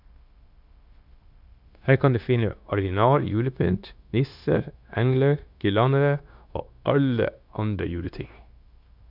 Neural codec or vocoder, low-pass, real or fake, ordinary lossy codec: codec, 16 kHz, 0.9 kbps, LongCat-Audio-Codec; 5.4 kHz; fake; none